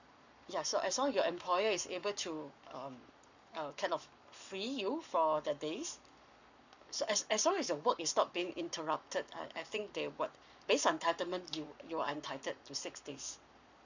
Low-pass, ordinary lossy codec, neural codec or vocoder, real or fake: 7.2 kHz; none; codec, 44.1 kHz, 7.8 kbps, Pupu-Codec; fake